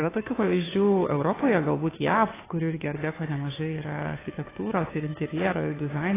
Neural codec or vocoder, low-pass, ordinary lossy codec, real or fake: codec, 16 kHz, 2 kbps, FunCodec, trained on Chinese and English, 25 frames a second; 3.6 kHz; AAC, 16 kbps; fake